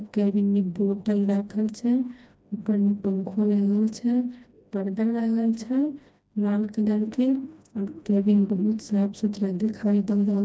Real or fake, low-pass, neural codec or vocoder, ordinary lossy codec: fake; none; codec, 16 kHz, 1 kbps, FreqCodec, smaller model; none